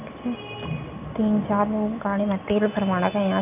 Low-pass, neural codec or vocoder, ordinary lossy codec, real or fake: 3.6 kHz; none; none; real